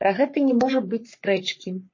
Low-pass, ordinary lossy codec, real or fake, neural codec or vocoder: 7.2 kHz; MP3, 32 kbps; fake; codec, 44.1 kHz, 3.4 kbps, Pupu-Codec